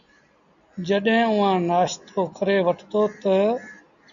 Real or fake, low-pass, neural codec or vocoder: real; 7.2 kHz; none